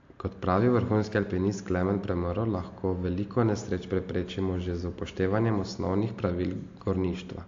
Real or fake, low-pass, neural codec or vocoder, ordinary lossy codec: real; 7.2 kHz; none; AAC, 48 kbps